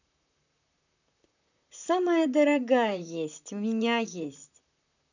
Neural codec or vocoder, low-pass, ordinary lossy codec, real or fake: vocoder, 44.1 kHz, 128 mel bands, Pupu-Vocoder; 7.2 kHz; none; fake